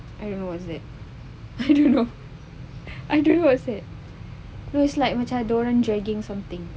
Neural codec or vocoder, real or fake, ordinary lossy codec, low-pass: none; real; none; none